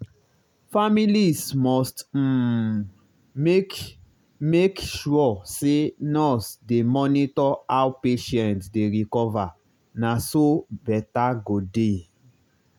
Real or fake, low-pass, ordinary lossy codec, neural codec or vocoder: real; 19.8 kHz; none; none